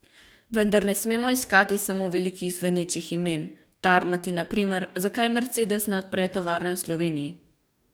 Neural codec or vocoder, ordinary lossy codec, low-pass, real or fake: codec, 44.1 kHz, 2.6 kbps, DAC; none; none; fake